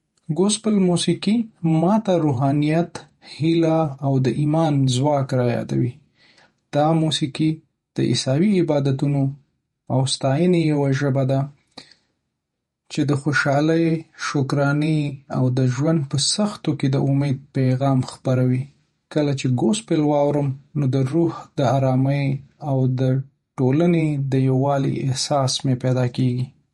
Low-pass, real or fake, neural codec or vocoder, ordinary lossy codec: 19.8 kHz; fake; vocoder, 48 kHz, 128 mel bands, Vocos; MP3, 48 kbps